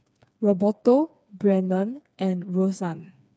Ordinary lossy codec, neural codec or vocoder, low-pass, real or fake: none; codec, 16 kHz, 4 kbps, FreqCodec, smaller model; none; fake